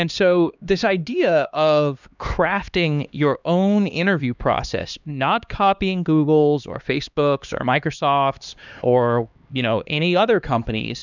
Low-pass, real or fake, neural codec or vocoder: 7.2 kHz; fake; codec, 16 kHz, 2 kbps, X-Codec, HuBERT features, trained on LibriSpeech